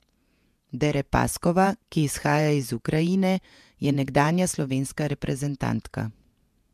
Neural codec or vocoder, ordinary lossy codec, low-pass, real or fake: vocoder, 48 kHz, 128 mel bands, Vocos; MP3, 96 kbps; 14.4 kHz; fake